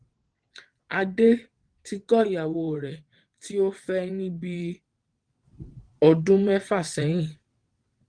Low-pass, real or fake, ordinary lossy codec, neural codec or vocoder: 9.9 kHz; fake; Opus, 24 kbps; vocoder, 22.05 kHz, 80 mel bands, WaveNeXt